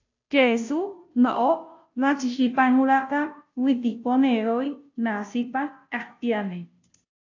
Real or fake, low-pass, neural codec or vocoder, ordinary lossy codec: fake; 7.2 kHz; codec, 16 kHz, 0.5 kbps, FunCodec, trained on Chinese and English, 25 frames a second; AAC, 48 kbps